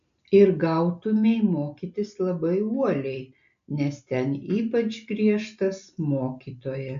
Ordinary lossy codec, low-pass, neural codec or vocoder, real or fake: AAC, 64 kbps; 7.2 kHz; none; real